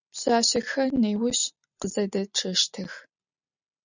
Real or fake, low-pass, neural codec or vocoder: real; 7.2 kHz; none